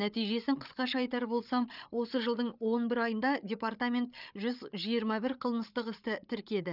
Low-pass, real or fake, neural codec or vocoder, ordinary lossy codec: 5.4 kHz; fake; codec, 16 kHz, 8 kbps, FreqCodec, larger model; none